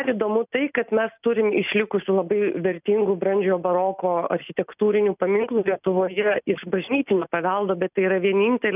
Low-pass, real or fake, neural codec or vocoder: 3.6 kHz; real; none